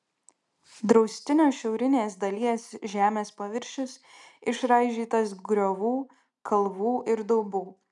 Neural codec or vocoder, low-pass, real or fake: none; 10.8 kHz; real